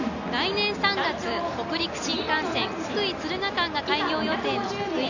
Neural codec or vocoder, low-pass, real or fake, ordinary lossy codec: none; 7.2 kHz; real; none